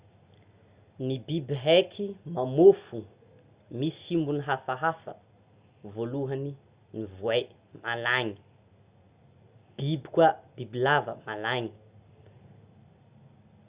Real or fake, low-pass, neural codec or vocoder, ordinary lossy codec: real; 3.6 kHz; none; Opus, 64 kbps